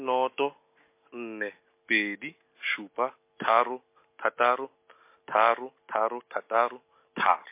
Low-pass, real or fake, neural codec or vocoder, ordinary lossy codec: 3.6 kHz; real; none; MP3, 24 kbps